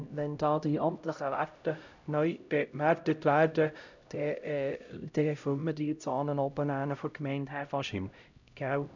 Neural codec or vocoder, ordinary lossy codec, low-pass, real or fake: codec, 16 kHz, 0.5 kbps, X-Codec, HuBERT features, trained on LibriSpeech; none; 7.2 kHz; fake